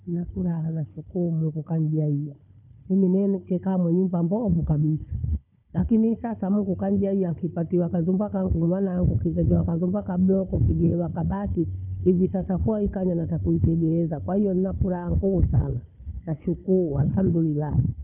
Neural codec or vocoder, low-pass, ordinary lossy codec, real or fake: codec, 16 kHz, 4 kbps, FunCodec, trained on Chinese and English, 50 frames a second; 3.6 kHz; none; fake